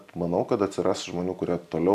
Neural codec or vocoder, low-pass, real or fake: none; 14.4 kHz; real